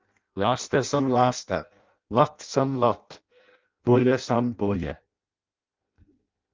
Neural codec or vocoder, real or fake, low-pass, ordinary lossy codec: codec, 16 kHz in and 24 kHz out, 0.6 kbps, FireRedTTS-2 codec; fake; 7.2 kHz; Opus, 24 kbps